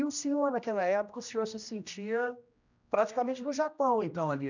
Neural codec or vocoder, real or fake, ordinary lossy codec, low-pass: codec, 16 kHz, 1 kbps, X-Codec, HuBERT features, trained on general audio; fake; none; 7.2 kHz